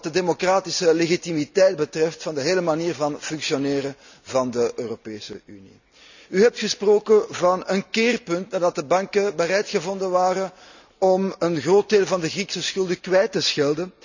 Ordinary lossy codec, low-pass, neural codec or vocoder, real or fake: none; 7.2 kHz; none; real